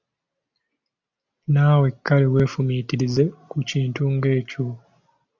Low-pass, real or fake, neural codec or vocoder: 7.2 kHz; real; none